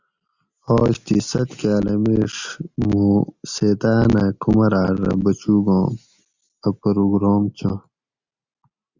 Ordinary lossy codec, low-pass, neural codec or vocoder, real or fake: Opus, 64 kbps; 7.2 kHz; none; real